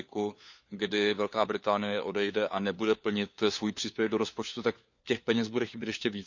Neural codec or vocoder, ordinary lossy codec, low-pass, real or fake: codec, 16 kHz, 4 kbps, FunCodec, trained on LibriTTS, 50 frames a second; none; 7.2 kHz; fake